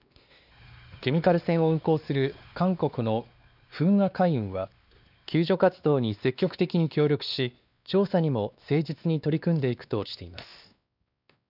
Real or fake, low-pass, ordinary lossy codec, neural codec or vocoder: fake; 5.4 kHz; none; codec, 16 kHz, 2 kbps, X-Codec, WavLM features, trained on Multilingual LibriSpeech